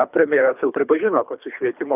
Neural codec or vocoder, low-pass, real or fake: codec, 24 kHz, 3 kbps, HILCodec; 3.6 kHz; fake